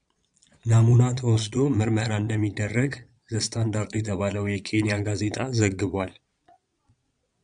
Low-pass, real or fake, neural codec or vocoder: 9.9 kHz; fake; vocoder, 22.05 kHz, 80 mel bands, Vocos